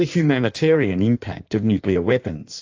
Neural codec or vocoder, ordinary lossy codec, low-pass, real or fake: codec, 16 kHz in and 24 kHz out, 1.1 kbps, FireRedTTS-2 codec; AAC, 48 kbps; 7.2 kHz; fake